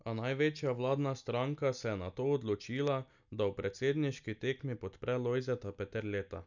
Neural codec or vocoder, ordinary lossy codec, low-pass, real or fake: none; none; 7.2 kHz; real